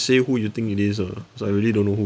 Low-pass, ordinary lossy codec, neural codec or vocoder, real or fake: none; none; none; real